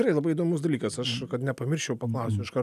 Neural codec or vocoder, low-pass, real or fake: none; 14.4 kHz; real